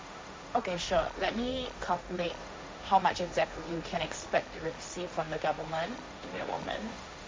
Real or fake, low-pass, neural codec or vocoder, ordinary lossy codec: fake; none; codec, 16 kHz, 1.1 kbps, Voila-Tokenizer; none